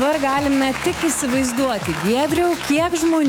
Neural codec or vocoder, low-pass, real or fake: autoencoder, 48 kHz, 128 numbers a frame, DAC-VAE, trained on Japanese speech; 19.8 kHz; fake